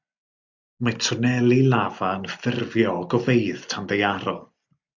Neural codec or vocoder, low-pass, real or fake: none; 7.2 kHz; real